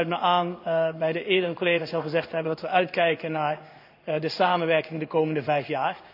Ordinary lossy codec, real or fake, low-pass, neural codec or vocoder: AAC, 48 kbps; fake; 5.4 kHz; codec, 16 kHz in and 24 kHz out, 1 kbps, XY-Tokenizer